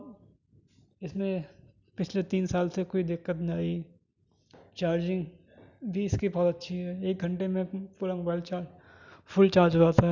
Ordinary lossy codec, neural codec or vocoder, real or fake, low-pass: none; none; real; 7.2 kHz